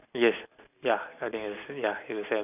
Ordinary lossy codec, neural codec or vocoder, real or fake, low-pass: none; none; real; 3.6 kHz